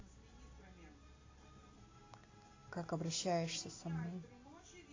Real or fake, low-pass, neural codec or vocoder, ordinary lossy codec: real; 7.2 kHz; none; AAC, 32 kbps